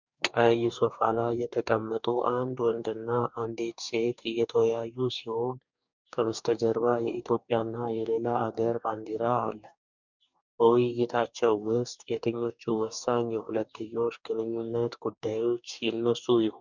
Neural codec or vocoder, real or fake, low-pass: codec, 44.1 kHz, 2.6 kbps, DAC; fake; 7.2 kHz